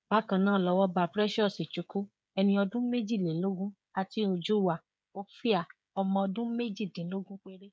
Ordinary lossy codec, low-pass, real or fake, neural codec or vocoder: none; none; fake; codec, 16 kHz, 16 kbps, FreqCodec, smaller model